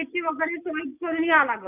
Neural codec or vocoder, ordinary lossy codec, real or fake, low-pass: none; none; real; 3.6 kHz